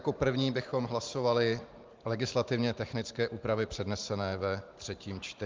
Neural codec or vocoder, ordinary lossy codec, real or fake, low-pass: vocoder, 44.1 kHz, 128 mel bands every 512 samples, BigVGAN v2; Opus, 32 kbps; fake; 7.2 kHz